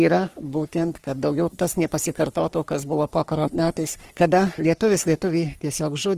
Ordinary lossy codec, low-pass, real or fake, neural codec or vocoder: Opus, 24 kbps; 14.4 kHz; fake; codec, 44.1 kHz, 3.4 kbps, Pupu-Codec